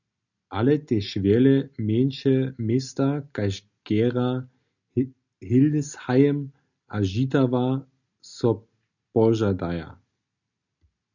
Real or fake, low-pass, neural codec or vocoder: real; 7.2 kHz; none